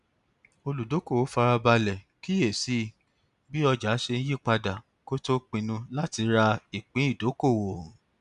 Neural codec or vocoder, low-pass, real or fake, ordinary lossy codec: none; 10.8 kHz; real; none